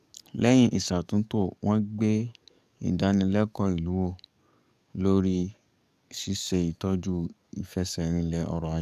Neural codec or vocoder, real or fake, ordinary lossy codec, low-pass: codec, 44.1 kHz, 7.8 kbps, DAC; fake; none; 14.4 kHz